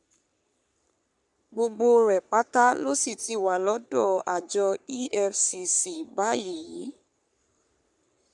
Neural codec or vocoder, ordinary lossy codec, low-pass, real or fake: codec, 44.1 kHz, 3.4 kbps, Pupu-Codec; none; 10.8 kHz; fake